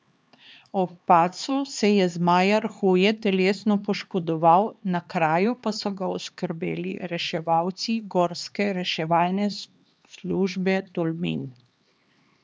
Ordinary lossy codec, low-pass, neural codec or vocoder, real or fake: none; none; codec, 16 kHz, 2 kbps, X-Codec, HuBERT features, trained on LibriSpeech; fake